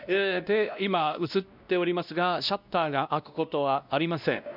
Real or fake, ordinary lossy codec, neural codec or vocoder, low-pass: fake; none; codec, 16 kHz, 1 kbps, X-Codec, WavLM features, trained on Multilingual LibriSpeech; 5.4 kHz